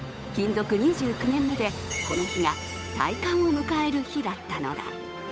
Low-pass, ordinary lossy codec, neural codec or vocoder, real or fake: none; none; codec, 16 kHz, 8 kbps, FunCodec, trained on Chinese and English, 25 frames a second; fake